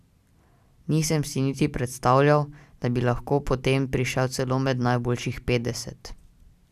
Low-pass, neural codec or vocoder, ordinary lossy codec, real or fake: 14.4 kHz; none; none; real